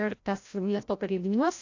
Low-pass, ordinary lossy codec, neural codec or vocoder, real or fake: 7.2 kHz; AAC, 48 kbps; codec, 16 kHz, 0.5 kbps, FreqCodec, larger model; fake